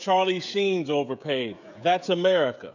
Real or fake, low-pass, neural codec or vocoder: fake; 7.2 kHz; codec, 16 kHz, 16 kbps, FreqCodec, smaller model